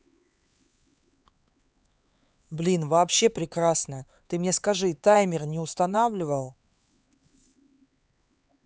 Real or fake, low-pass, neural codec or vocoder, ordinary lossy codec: fake; none; codec, 16 kHz, 4 kbps, X-Codec, HuBERT features, trained on LibriSpeech; none